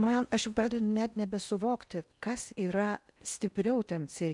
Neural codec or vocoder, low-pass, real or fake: codec, 16 kHz in and 24 kHz out, 0.8 kbps, FocalCodec, streaming, 65536 codes; 10.8 kHz; fake